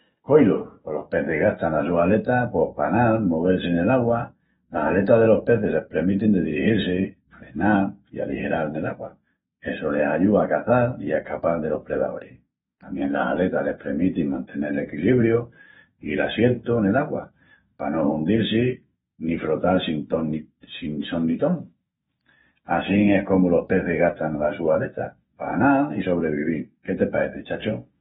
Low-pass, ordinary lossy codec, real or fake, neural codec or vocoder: 19.8 kHz; AAC, 16 kbps; fake; autoencoder, 48 kHz, 128 numbers a frame, DAC-VAE, trained on Japanese speech